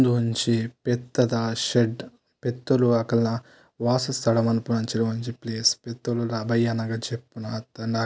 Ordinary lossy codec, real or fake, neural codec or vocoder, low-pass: none; real; none; none